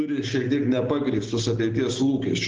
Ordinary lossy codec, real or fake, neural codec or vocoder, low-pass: Opus, 16 kbps; real; none; 7.2 kHz